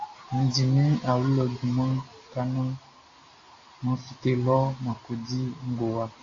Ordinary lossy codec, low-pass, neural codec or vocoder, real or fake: AAC, 32 kbps; 7.2 kHz; none; real